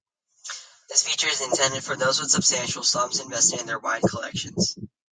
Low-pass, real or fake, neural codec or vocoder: 9.9 kHz; real; none